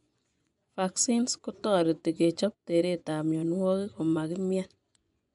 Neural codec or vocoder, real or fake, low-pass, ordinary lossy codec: vocoder, 24 kHz, 100 mel bands, Vocos; fake; 10.8 kHz; MP3, 96 kbps